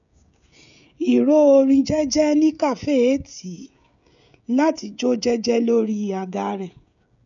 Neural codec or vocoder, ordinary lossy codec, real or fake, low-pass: codec, 16 kHz, 16 kbps, FreqCodec, smaller model; none; fake; 7.2 kHz